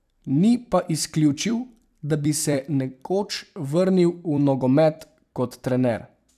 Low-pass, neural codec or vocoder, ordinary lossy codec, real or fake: 14.4 kHz; vocoder, 44.1 kHz, 128 mel bands every 256 samples, BigVGAN v2; none; fake